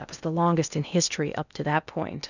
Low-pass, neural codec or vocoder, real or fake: 7.2 kHz; codec, 16 kHz in and 24 kHz out, 0.8 kbps, FocalCodec, streaming, 65536 codes; fake